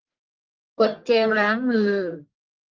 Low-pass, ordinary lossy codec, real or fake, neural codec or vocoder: 7.2 kHz; Opus, 16 kbps; fake; codec, 44.1 kHz, 1.7 kbps, Pupu-Codec